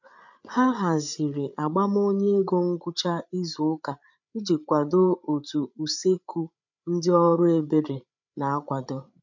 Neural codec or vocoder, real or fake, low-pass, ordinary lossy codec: codec, 16 kHz, 8 kbps, FreqCodec, larger model; fake; 7.2 kHz; none